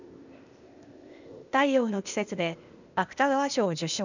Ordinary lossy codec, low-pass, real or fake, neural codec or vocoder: none; 7.2 kHz; fake; codec, 16 kHz, 0.8 kbps, ZipCodec